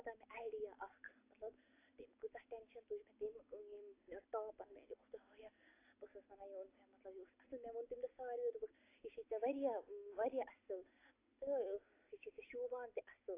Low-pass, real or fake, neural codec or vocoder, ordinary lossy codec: 3.6 kHz; real; none; AAC, 24 kbps